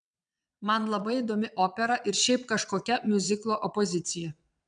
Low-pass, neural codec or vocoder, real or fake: 9.9 kHz; vocoder, 22.05 kHz, 80 mel bands, WaveNeXt; fake